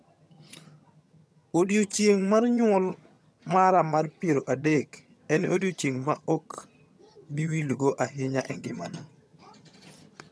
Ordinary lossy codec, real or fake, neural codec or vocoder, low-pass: none; fake; vocoder, 22.05 kHz, 80 mel bands, HiFi-GAN; none